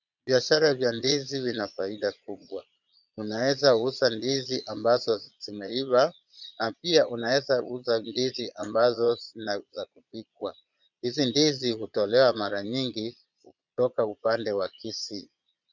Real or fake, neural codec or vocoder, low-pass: fake; vocoder, 22.05 kHz, 80 mel bands, Vocos; 7.2 kHz